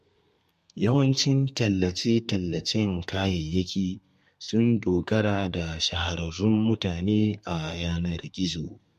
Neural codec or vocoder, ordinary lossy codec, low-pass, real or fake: codec, 32 kHz, 1.9 kbps, SNAC; MP3, 64 kbps; 14.4 kHz; fake